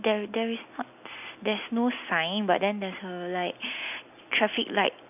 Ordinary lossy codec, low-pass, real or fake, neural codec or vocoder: none; 3.6 kHz; real; none